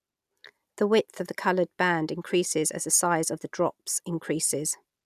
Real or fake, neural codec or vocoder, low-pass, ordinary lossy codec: real; none; 14.4 kHz; none